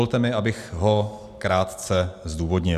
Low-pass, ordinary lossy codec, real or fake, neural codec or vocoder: 14.4 kHz; AAC, 96 kbps; real; none